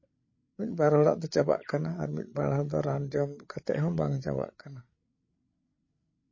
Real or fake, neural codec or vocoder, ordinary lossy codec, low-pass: fake; codec, 16 kHz, 8 kbps, FreqCodec, larger model; MP3, 32 kbps; 7.2 kHz